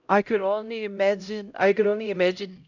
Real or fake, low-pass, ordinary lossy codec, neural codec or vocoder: fake; 7.2 kHz; none; codec, 16 kHz, 0.5 kbps, X-Codec, HuBERT features, trained on LibriSpeech